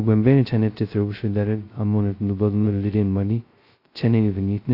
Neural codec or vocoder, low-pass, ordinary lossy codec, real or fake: codec, 16 kHz, 0.2 kbps, FocalCodec; 5.4 kHz; AAC, 32 kbps; fake